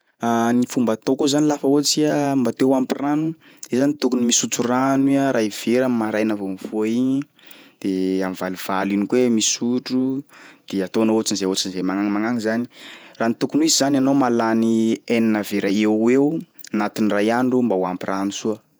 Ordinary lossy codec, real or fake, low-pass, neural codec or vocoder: none; fake; none; vocoder, 48 kHz, 128 mel bands, Vocos